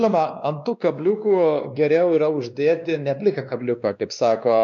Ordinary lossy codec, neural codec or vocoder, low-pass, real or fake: MP3, 64 kbps; codec, 16 kHz, 2 kbps, X-Codec, WavLM features, trained on Multilingual LibriSpeech; 7.2 kHz; fake